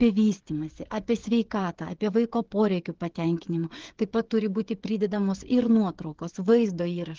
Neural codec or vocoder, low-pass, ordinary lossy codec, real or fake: codec, 16 kHz, 8 kbps, FreqCodec, smaller model; 7.2 kHz; Opus, 16 kbps; fake